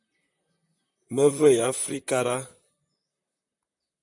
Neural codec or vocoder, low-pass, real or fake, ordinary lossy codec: vocoder, 44.1 kHz, 128 mel bands, Pupu-Vocoder; 10.8 kHz; fake; MP3, 64 kbps